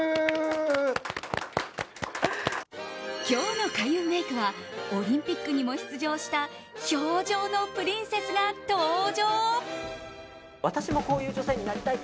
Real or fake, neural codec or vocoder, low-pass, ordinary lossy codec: real; none; none; none